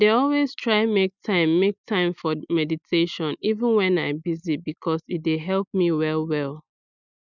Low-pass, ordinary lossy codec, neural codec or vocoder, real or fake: 7.2 kHz; none; none; real